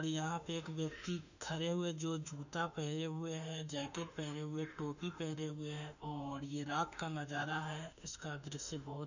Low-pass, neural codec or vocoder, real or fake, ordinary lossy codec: 7.2 kHz; autoencoder, 48 kHz, 32 numbers a frame, DAC-VAE, trained on Japanese speech; fake; none